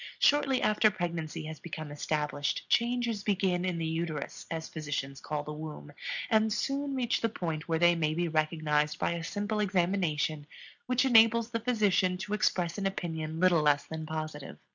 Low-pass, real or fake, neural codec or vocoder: 7.2 kHz; real; none